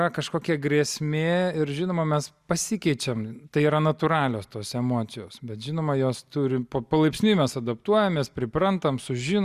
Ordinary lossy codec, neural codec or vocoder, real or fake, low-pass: Opus, 64 kbps; none; real; 14.4 kHz